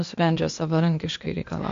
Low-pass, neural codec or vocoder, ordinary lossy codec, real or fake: 7.2 kHz; codec, 16 kHz, 0.8 kbps, ZipCodec; MP3, 48 kbps; fake